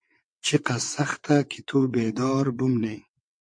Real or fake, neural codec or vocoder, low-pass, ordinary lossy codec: fake; vocoder, 22.05 kHz, 80 mel bands, WaveNeXt; 9.9 kHz; MP3, 48 kbps